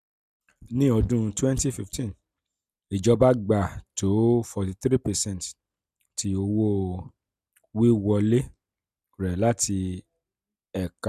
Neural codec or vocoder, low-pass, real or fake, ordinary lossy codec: none; 14.4 kHz; real; none